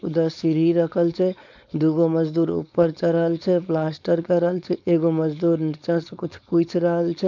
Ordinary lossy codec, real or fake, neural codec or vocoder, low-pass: none; fake; codec, 16 kHz, 4.8 kbps, FACodec; 7.2 kHz